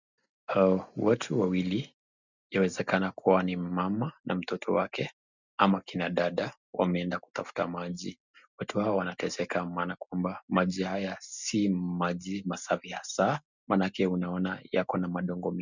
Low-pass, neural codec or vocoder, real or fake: 7.2 kHz; none; real